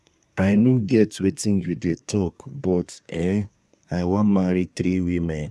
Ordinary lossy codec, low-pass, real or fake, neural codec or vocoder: none; none; fake; codec, 24 kHz, 1 kbps, SNAC